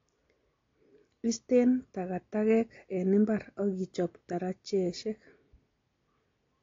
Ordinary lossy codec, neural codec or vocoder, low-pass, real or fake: AAC, 32 kbps; none; 7.2 kHz; real